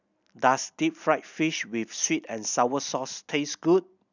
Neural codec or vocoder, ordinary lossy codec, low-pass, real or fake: none; none; 7.2 kHz; real